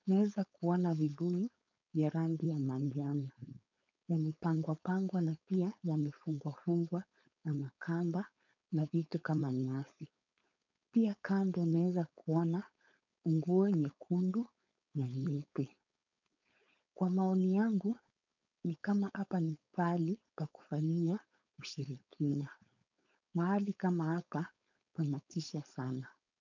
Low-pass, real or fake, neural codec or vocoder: 7.2 kHz; fake; codec, 16 kHz, 4.8 kbps, FACodec